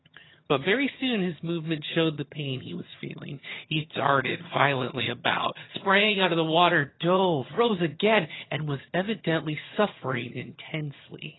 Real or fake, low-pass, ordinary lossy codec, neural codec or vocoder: fake; 7.2 kHz; AAC, 16 kbps; vocoder, 22.05 kHz, 80 mel bands, HiFi-GAN